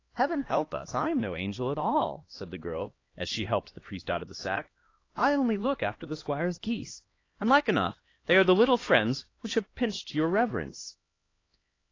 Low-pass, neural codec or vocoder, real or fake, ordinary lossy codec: 7.2 kHz; codec, 16 kHz, 2 kbps, X-Codec, HuBERT features, trained on LibriSpeech; fake; AAC, 32 kbps